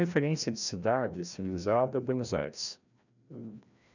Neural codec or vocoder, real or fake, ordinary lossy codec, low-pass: codec, 16 kHz, 1 kbps, FreqCodec, larger model; fake; none; 7.2 kHz